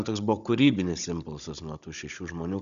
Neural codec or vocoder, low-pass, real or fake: codec, 16 kHz, 16 kbps, FunCodec, trained on Chinese and English, 50 frames a second; 7.2 kHz; fake